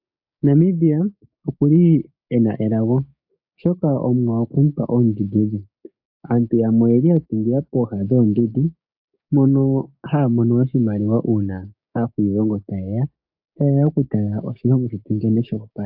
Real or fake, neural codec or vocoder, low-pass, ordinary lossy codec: fake; codec, 16 kHz, 6 kbps, DAC; 5.4 kHz; AAC, 48 kbps